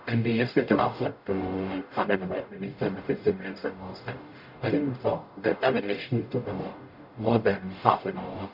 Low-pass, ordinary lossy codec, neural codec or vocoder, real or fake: 5.4 kHz; none; codec, 44.1 kHz, 0.9 kbps, DAC; fake